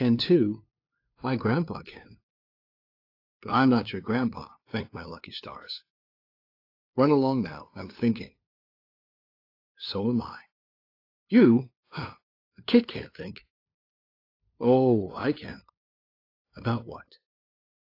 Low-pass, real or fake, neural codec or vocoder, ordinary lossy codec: 5.4 kHz; fake; codec, 16 kHz, 2 kbps, FunCodec, trained on LibriTTS, 25 frames a second; AAC, 32 kbps